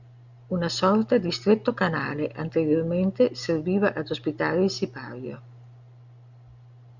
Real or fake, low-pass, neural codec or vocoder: real; 7.2 kHz; none